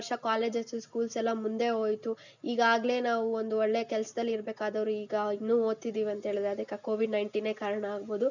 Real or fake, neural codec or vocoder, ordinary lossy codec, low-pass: real; none; none; 7.2 kHz